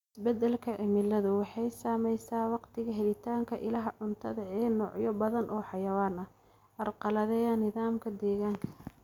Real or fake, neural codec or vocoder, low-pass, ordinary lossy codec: real; none; 19.8 kHz; none